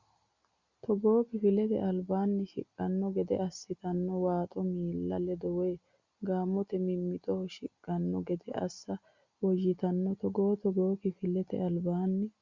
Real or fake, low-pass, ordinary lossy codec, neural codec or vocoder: real; 7.2 kHz; Opus, 64 kbps; none